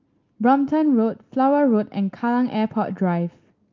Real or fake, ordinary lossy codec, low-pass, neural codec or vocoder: real; Opus, 32 kbps; 7.2 kHz; none